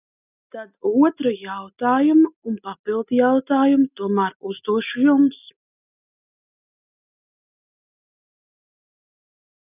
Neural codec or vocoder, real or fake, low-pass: none; real; 3.6 kHz